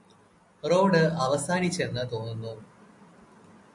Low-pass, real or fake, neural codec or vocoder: 10.8 kHz; real; none